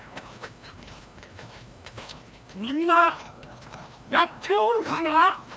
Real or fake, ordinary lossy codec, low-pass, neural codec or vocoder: fake; none; none; codec, 16 kHz, 1 kbps, FreqCodec, larger model